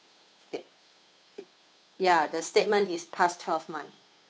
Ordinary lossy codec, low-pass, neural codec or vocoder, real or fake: none; none; codec, 16 kHz, 2 kbps, FunCodec, trained on Chinese and English, 25 frames a second; fake